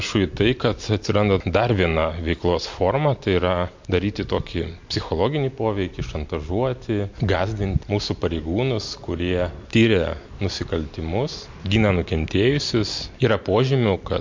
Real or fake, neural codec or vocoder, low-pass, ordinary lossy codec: real; none; 7.2 kHz; MP3, 48 kbps